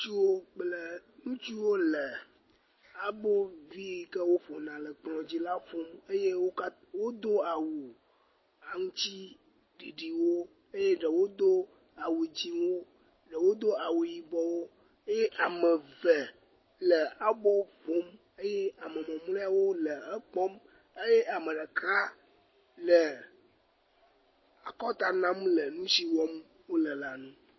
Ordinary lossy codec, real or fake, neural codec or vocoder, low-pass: MP3, 24 kbps; real; none; 7.2 kHz